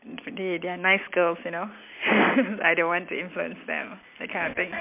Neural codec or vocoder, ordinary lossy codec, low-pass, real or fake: none; MP3, 32 kbps; 3.6 kHz; real